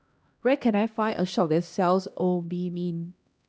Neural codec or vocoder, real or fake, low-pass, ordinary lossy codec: codec, 16 kHz, 1 kbps, X-Codec, HuBERT features, trained on LibriSpeech; fake; none; none